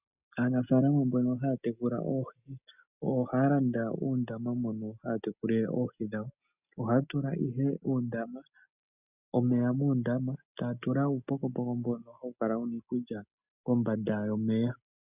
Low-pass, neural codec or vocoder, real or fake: 3.6 kHz; none; real